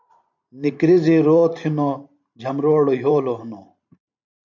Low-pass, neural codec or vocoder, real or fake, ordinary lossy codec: 7.2 kHz; vocoder, 44.1 kHz, 128 mel bands every 512 samples, BigVGAN v2; fake; MP3, 64 kbps